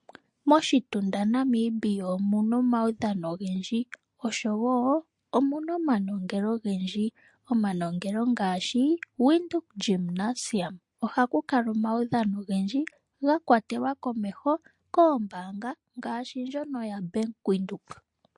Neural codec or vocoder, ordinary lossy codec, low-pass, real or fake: none; MP3, 48 kbps; 10.8 kHz; real